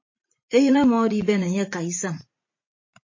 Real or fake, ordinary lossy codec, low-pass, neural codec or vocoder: fake; MP3, 32 kbps; 7.2 kHz; vocoder, 44.1 kHz, 80 mel bands, Vocos